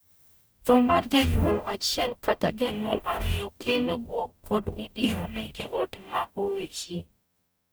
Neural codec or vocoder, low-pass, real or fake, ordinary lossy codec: codec, 44.1 kHz, 0.9 kbps, DAC; none; fake; none